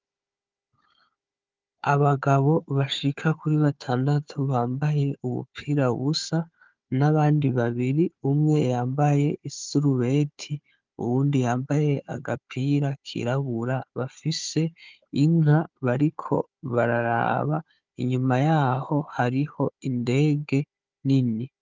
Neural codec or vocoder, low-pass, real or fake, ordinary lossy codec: codec, 16 kHz, 4 kbps, FunCodec, trained on Chinese and English, 50 frames a second; 7.2 kHz; fake; Opus, 24 kbps